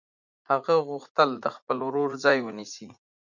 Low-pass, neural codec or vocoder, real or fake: 7.2 kHz; vocoder, 44.1 kHz, 80 mel bands, Vocos; fake